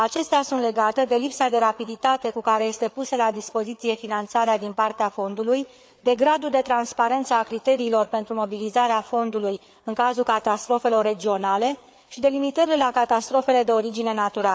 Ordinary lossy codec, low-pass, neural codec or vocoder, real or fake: none; none; codec, 16 kHz, 4 kbps, FreqCodec, larger model; fake